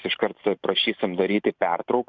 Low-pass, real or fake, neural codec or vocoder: 7.2 kHz; fake; vocoder, 44.1 kHz, 128 mel bands every 512 samples, BigVGAN v2